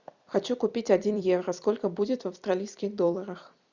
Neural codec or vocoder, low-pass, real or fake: vocoder, 44.1 kHz, 128 mel bands every 256 samples, BigVGAN v2; 7.2 kHz; fake